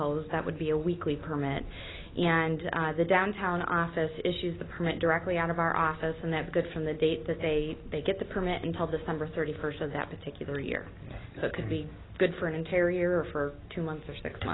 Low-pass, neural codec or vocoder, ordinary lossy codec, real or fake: 7.2 kHz; none; AAC, 16 kbps; real